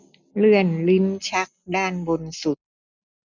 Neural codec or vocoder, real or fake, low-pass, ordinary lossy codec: none; real; 7.2 kHz; none